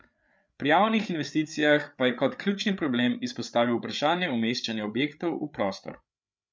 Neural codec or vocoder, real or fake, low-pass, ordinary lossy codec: codec, 16 kHz, 8 kbps, FreqCodec, larger model; fake; none; none